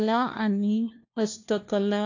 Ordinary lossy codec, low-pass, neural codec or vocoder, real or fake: AAC, 48 kbps; 7.2 kHz; codec, 16 kHz, 1 kbps, FunCodec, trained on LibriTTS, 50 frames a second; fake